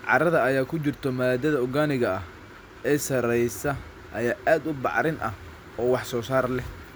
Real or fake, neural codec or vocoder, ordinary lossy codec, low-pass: real; none; none; none